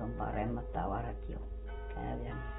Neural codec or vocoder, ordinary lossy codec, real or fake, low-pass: none; AAC, 16 kbps; real; 19.8 kHz